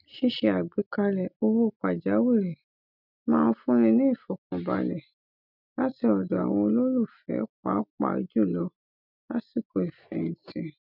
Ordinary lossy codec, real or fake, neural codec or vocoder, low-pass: none; real; none; 5.4 kHz